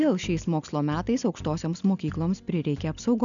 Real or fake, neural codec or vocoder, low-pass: real; none; 7.2 kHz